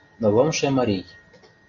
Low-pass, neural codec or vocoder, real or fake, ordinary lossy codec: 7.2 kHz; none; real; MP3, 96 kbps